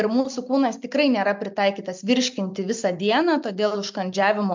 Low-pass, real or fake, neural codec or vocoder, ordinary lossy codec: 7.2 kHz; real; none; MP3, 64 kbps